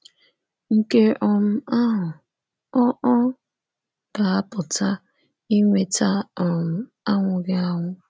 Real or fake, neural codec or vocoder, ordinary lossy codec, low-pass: real; none; none; none